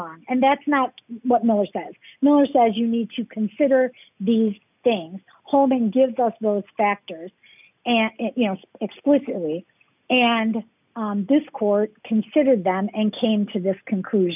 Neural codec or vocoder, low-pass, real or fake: none; 3.6 kHz; real